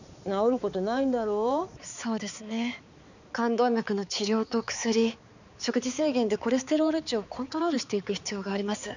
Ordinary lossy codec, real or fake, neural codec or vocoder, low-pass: none; fake; codec, 16 kHz, 4 kbps, X-Codec, HuBERT features, trained on balanced general audio; 7.2 kHz